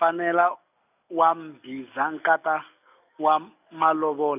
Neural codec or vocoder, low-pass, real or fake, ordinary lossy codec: none; 3.6 kHz; real; none